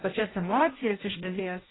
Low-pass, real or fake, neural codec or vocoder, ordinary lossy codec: 7.2 kHz; fake; codec, 16 kHz in and 24 kHz out, 0.6 kbps, FireRedTTS-2 codec; AAC, 16 kbps